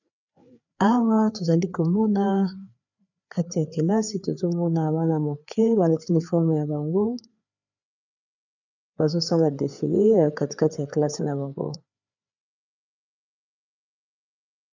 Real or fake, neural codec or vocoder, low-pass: fake; codec, 16 kHz, 4 kbps, FreqCodec, larger model; 7.2 kHz